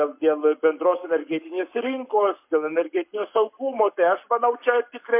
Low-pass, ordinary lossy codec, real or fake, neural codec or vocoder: 3.6 kHz; MP3, 32 kbps; fake; codec, 44.1 kHz, 7.8 kbps, Pupu-Codec